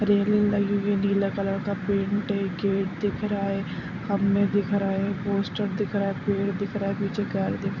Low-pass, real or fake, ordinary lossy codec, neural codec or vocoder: 7.2 kHz; fake; none; vocoder, 44.1 kHz, 128 mel bands every 256 samples, BigVGAN v2